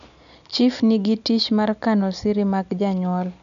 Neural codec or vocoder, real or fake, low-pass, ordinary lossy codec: none; real; 7.2 kHz; none